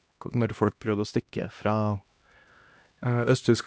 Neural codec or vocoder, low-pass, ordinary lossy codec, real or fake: codec, 16 kHz, 1 kbps, X-Codec, HuBERT features, trained on LibriSpeech; none; none; fake